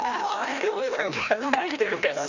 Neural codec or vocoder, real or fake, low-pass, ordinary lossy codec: codec, 16 kHz, 1 kbps, FreqCodec, larger model; fake; 7.2 kHz; none